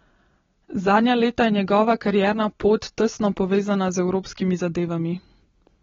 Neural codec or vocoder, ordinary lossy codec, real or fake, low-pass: none; AAC, 24 kbps; real; 7.2 kHz